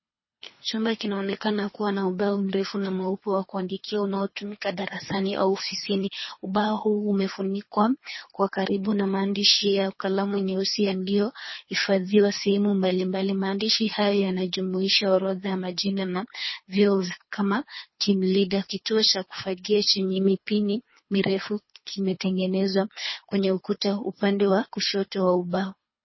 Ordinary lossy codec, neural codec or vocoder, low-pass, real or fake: MP3, 24 kbps; codec, 24 kHz, 3 kbps, HILCodec; 7.2 kHz; fake